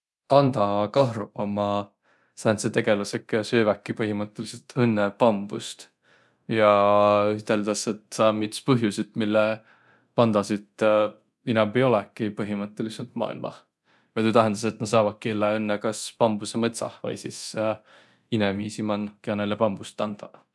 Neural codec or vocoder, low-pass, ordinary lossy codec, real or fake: codec, 24 kHz, 0.9 kbps, DualCodec; none; none; fake